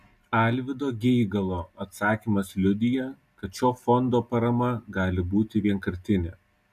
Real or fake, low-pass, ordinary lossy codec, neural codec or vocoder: real; 14.4 kHz; MP3, 96 kbps; none